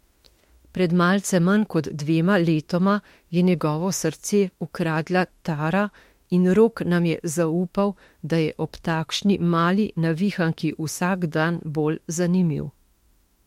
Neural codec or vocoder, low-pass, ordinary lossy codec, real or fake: autoencoder, 48 kHz, 32 numbers a frame, DAC-VAE, trained on Japanese speech; 19.8 kHz; MP3, 64 kbps; fake